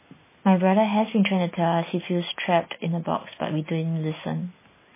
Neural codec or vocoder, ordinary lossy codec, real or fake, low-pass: none; MP3, 16 kbps; real; 3.6 kHz